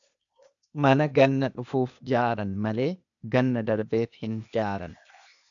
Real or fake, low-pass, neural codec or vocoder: fake; 7.2 kHz; codec, 16 kHz, 0.8 kbps, ZipCodec